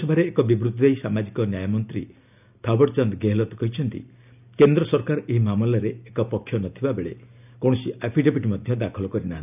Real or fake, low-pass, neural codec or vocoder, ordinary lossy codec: real; 3.6 kHz; none; none